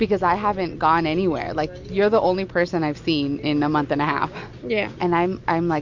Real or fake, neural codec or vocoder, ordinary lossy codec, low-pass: real; none; MP3, 48 kbps; 7.2 kHz